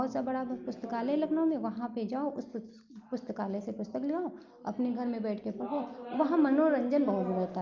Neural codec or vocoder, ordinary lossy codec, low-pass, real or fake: none; Opus, 24 kbps; 7.2 kHz; real